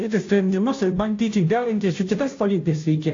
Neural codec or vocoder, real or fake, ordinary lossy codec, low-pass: codec, 16 kHz, 0.5 kbps, FunCodec, trained on Chinese and English, 25 frames a second; fake; AAC, 48 kbps; 7.2 kHz